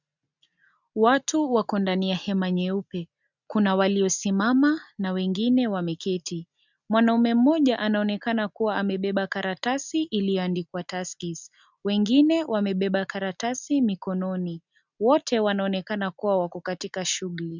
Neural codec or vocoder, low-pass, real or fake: none; 7.2 kHz; real